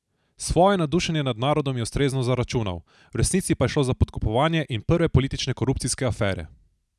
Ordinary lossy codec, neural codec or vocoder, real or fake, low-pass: none; none; real; none